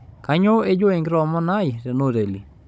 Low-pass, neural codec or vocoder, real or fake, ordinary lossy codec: none; codec, 16 kHz, 16 kbps, FunCodec, trained on Chinese and English, 50 frames a second; fake; none